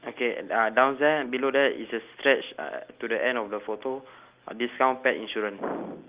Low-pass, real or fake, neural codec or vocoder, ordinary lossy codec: 3.6 kHz; real; none; Opus, 24 kbps